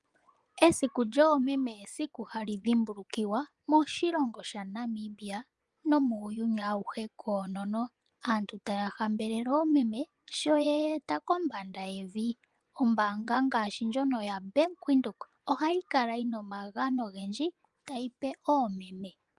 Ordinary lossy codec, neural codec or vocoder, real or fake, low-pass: Opus, 32 kbps; vocoder, 24 kHz, 100 mel bands, Vocos; fake; 10.8 kHz